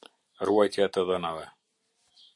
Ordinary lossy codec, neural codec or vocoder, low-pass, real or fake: AAC, 64 kbps; none; 10.8 kHz; real